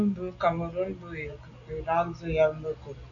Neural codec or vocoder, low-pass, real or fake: none; 7.2 kHz; real